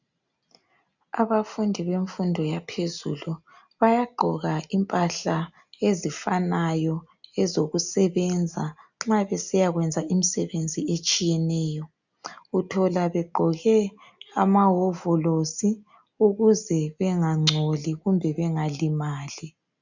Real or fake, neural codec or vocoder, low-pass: real; none; 7.2 kHz